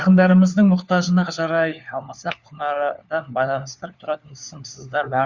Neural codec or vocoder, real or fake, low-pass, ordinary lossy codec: codec, 16 kHz, 4 kbps, FunCodec, trained on LibriTTS, 50 frames a second; fake; 7.2 kHz; Opus, 64 kbps